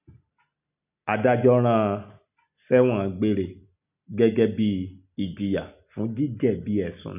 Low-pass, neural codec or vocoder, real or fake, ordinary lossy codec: 3.6 kHz; none; real; none